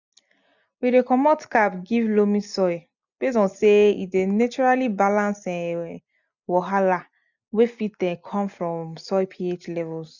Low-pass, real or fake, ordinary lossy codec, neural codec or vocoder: 7.2 kHz; real; none; none